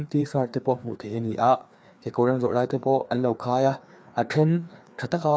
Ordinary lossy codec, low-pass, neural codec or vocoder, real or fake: none; none; codec, 16 kHz, 2 kbps, FreqCodec, larger model; fake